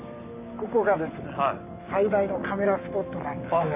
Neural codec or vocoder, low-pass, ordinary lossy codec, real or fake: none; 3.6 kHz; none; real